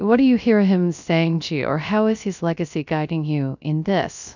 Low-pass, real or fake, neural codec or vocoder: 7.2 kHz; fake; codec, 16 kHz, 0.3 kbps, FocalCodec